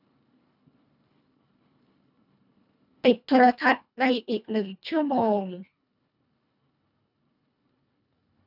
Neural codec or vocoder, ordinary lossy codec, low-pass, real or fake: codec, 24 kHz, 1.5 kbps, HILCodec; none; 5.4 kHz; fake